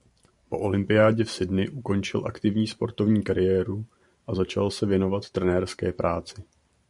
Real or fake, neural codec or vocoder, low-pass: fake; vocoder, 44.1 kHz, 128 mel bands every 512 samples, BigVGAN v2; 10.8 kHz